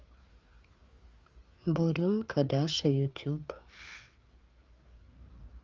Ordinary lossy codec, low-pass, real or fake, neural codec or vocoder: Opus, 32 kbps; 7.2 kHz; fake; codec, 16 kHz, 4 kbps, FreqCodec, larger model